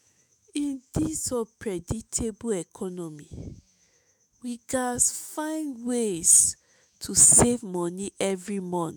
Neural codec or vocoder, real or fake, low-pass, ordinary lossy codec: autoencoder, 48 kHz, 128 numbers a frame, DAC-VAE, trained on Japanese speech; fake; none; none